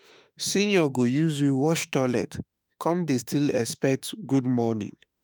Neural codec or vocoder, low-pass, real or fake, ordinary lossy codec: autoencoder, 48 kHz, 32 numbers a frame, DAC-VAE, trained on Japanese speech; none; fake; none